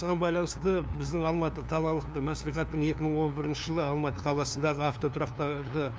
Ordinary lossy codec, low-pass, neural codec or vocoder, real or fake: none; none; codec, 16 kHz, 2 kbps, FunCodec, trained on LibriTTS, 25 frames a second; fake